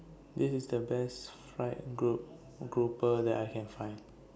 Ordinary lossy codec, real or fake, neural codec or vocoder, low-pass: none; real; none; none